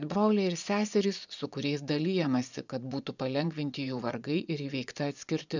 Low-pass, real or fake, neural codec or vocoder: 7.2 kHz; fake; vocoder, 24 kHz, 100 mel bands, Vocos